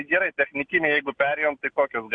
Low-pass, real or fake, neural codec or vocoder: 9.9 kHz; real; none